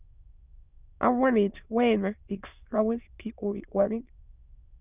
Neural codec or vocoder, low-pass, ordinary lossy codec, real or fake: autoencoder, 22.05 kHz, a latent of 192 numbers a frame, VITS, trained on many speakers; 3.6 kHz; Opus, 64 kbps; fake